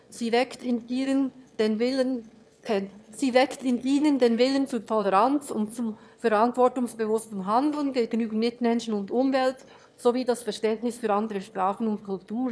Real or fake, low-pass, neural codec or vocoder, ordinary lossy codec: fake; none; autoencoder, 22.05 kHz, a latent of 192 numbers a frame, VITS, trained on one speaker; none